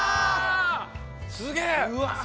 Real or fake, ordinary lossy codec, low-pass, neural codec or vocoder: real; none; none; none